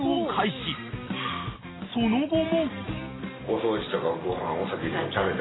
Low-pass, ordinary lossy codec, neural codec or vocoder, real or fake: 7.2 kHz; AAC, 16 kbps; none; real